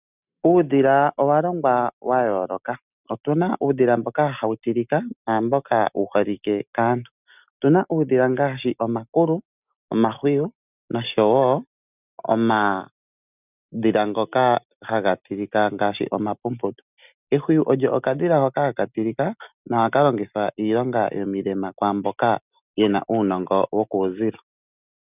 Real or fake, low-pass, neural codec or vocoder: real; 3.6 kHz; none